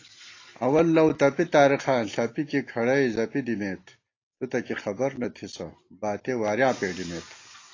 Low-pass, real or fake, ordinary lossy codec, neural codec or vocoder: 7.2 kHz; real; AAC, 32 kbps; none